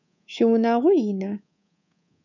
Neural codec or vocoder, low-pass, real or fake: codec, 24 kHz, 3.1 kbps, DualCodec; 7.2 kHz; fake